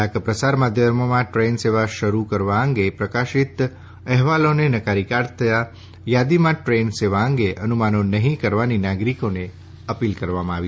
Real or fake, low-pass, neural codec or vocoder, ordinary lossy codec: real; none; none; none